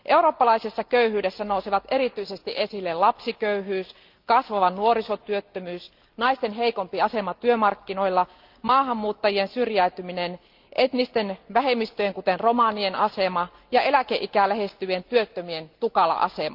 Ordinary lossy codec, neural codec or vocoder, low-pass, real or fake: Opus, 24 kbps; none; 5.4 kHz; real